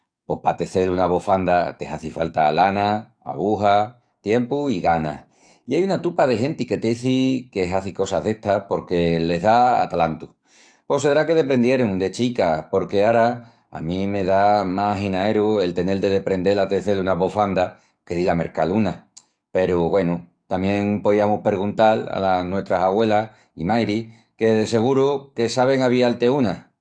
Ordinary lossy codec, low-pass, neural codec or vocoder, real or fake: AAC, 64 kbps; 9.9 kHz; codec, 44.1 kHz, 7.8 kbps, DAC; fake